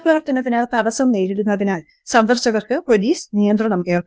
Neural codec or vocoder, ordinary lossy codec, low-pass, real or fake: codec, 16 kHz, 0.8 kbps, ZipCodec; none; none; fake